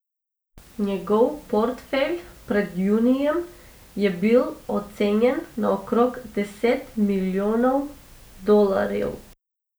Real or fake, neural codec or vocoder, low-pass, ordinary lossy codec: real; none; none; none